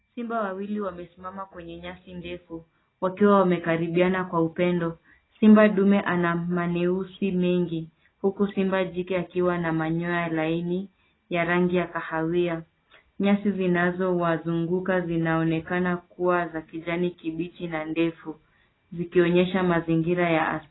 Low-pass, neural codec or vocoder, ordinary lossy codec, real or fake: 7.2 kHz; none; AAC, 16 kbps; real